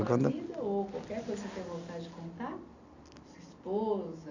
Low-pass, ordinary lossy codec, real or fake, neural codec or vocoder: 7.2 kHz; none; real; none